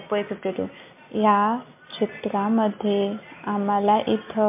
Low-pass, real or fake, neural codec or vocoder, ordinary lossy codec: 3.6 kHz; real; none; MP3, 32 kbps